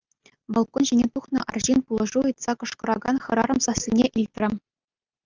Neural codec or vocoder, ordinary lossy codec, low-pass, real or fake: none; Opus, 24 kbps; 7.2 kHz; real